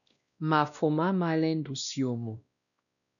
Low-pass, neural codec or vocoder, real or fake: 7.2 kHz; codec, 16 kHz, 1 kbps, X-Codec, WavLM features, trained on Multilingual LibriSpeech; fake